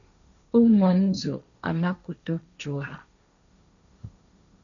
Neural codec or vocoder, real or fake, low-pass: codec, 16 kHz, 1.1 kbps, Voila-Tokenizer; fake; 7.2 kHz